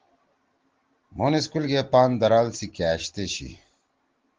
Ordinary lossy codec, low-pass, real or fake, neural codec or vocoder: Opus, 16 kbps; 7.2 kHz; real; none